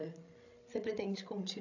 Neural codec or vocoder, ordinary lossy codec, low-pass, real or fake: codec, 16 kHz, 16 kbps, FreqCodec, larger model; none; 7.2 kHz; fake